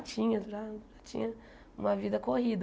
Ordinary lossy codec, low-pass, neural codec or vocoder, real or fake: none; none; none; real